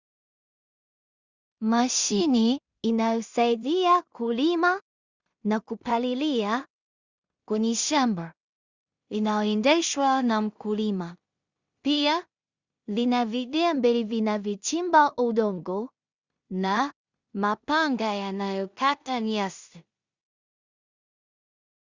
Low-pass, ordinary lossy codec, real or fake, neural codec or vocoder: 7.2 kHz; Opus, 64 kbps; fake; codec, 16 kHz in and 24 kHz out, 0.4 kbps, LongCat-Audio-Codec, two codebook decoder